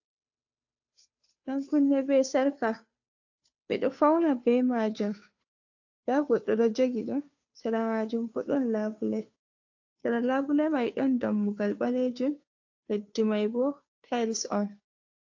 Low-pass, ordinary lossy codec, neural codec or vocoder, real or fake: 7.2 kHz; AAC, 48 kbps; codec, 16 kHz, 2 kbps, FunCodec, trained on Chinese and English, 25 frames a second; fake